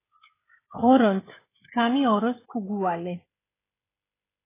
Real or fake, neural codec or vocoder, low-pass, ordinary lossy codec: fake; codec, 16 kHz, 16 kbps, FreqCodec, smaller model; 3.6 kHz; AAC, 16 kbps